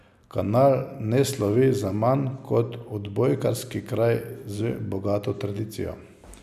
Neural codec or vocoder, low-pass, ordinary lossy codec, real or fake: none; 14.4 kHz; none; real